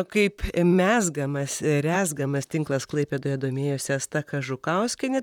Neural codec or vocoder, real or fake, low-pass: vocoder, 44.1 kHz, 128 mel bands, Pupu-Vocoder; fake; 19.8 kHz